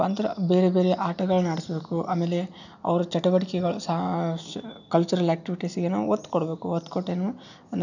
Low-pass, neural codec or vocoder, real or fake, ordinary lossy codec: 7.2 kHz; none; real; none